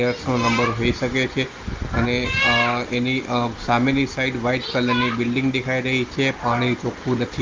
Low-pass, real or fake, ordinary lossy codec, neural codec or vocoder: 7.2 kHz; real; Opus, 32 kbps; none